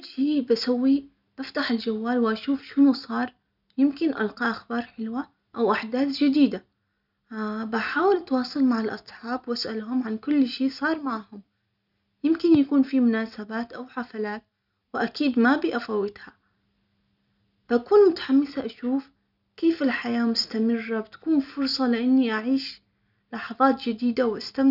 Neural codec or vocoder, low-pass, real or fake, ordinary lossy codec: none; 5.4 kHz; real; none